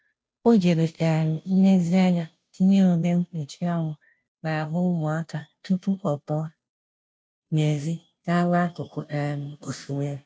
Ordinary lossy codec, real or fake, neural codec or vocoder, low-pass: none; fake; codec, 16 kHz, 0.5 kbps, FunCodec, trained on Chinese and English, 25 frames a second; none